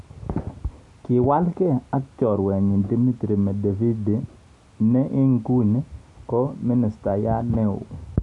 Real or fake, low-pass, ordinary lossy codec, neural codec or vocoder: real; 10.8 kHz; none; none